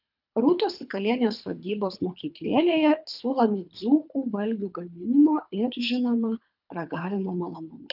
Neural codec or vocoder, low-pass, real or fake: codec, 24 kHz, 3 kbps, HILCodec; 5.4 kHz; fake